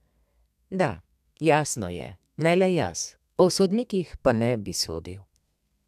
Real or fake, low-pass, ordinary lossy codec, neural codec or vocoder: fake; 14.4 kHz; none; codec, 32 kHz, 1.9 kbps, SNAC